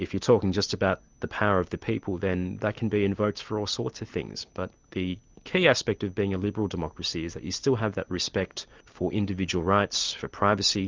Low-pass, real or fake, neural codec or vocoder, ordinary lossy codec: 7.2 kHz; real; none; Opus, 32 kbps